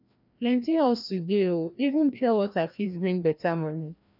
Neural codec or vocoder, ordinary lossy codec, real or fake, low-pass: codec, 16 kHz, 1 kbps, FreqCodec, larger model; none; fake; 5.4 kHz